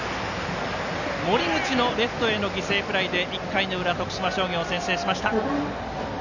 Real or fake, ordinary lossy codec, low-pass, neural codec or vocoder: real; none; 7.2 kHz; none